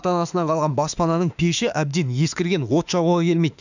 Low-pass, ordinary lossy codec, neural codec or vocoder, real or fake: 7.2 kHz; none; autoencoder, 48 kHz, 32 numbers a frame, DAC-VAE, trained on Japanese speech; fake